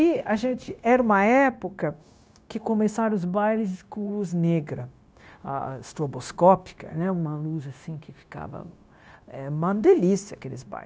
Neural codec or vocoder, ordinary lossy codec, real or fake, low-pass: codec, 16 kHz, 0.9 kbps, LongCat-Audio-Codec; none; fake; none